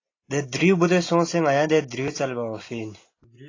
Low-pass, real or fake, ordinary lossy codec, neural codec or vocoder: 7.2 kHz; real; AAC, 32 kbps; none